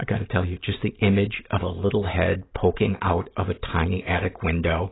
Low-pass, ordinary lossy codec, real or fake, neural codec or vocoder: 7.2 kHz; AAC, 16 kbps; real; none